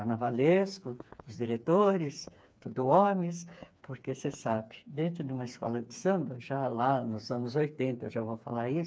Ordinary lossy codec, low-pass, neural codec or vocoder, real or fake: none; none; codec, 16 kHz, 4 kbps, FreqCodec, smaller model; fake